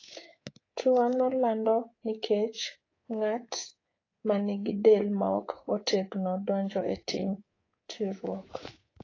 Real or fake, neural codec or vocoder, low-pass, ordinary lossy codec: fake; codec, 24 kHz, 3.1 kbps, DualCodec; 7.2 kHz; AAC, 32 kbps